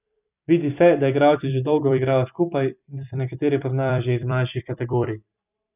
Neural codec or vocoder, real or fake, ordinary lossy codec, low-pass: vocoder, 44.1 kHz, 128 mel bands every 256 samples, BigVGAN v2; fake; none; 3.6 kHz